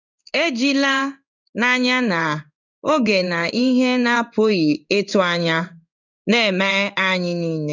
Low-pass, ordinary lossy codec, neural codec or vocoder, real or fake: 7.2 kHz; none; codec, 16 kHz in and 24 kHz out, 1 kbps, XY-Tokenizer; fake